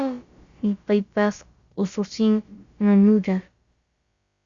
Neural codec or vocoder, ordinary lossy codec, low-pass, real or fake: codec, 16 kHz, about 1 kbps, DyCAST, with the encoder's durations; Opus, 64 kbps; 7.2 kHz; fake